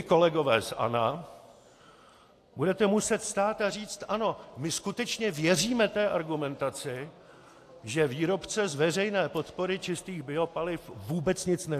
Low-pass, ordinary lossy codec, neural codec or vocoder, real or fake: 14.4 kHz; AAC, 64 kbps; none; real